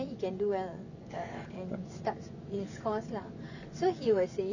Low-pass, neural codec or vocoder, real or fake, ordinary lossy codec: 7.2 kHz; vocoder, 24 kHz, 100 mel bands, Vocos; fake; none